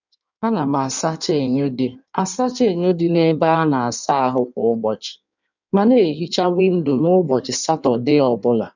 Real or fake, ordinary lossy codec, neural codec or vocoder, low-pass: fake; none; codec, 16 kHz in and 24 kHz out, 1.1 kbps, FireRedTTS-2 codec; 7.2 kHz